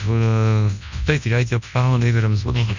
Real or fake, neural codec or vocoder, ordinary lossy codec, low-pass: fake; codec, 24 kHz, 0.9 kbps, WavTokenizer, large speech release; none; 7.2 kHz